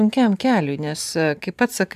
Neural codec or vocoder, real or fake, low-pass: vocoder, 44.1 kHz, 128 mel bands every 512 samples, BigVGAN v2; fake; 14.4 kHz